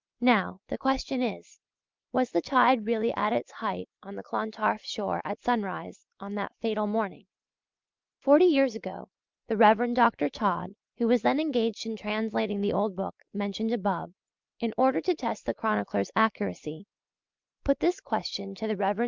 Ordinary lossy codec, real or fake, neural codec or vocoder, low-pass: Opus, 24 kbps; real; none; 7.2 kHz